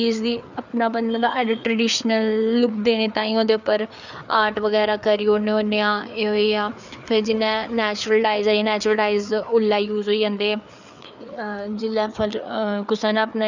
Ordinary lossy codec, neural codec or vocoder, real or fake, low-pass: none; codec, 16 kHz, 4 kbps, FreqCodec, larger model; fake; 7.2 kHz